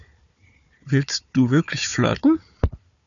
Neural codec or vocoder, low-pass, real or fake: codec, 16 kHz, 4 kbps, FunCodec, trained on Chinese and English, 50 frames a second; 7.2 kHz; fake